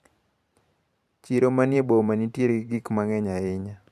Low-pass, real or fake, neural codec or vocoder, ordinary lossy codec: 14.4 kHz; fake; vocoder, 44.1 kHz, 128 mel bands every 512 samples, BigVGAN v2; none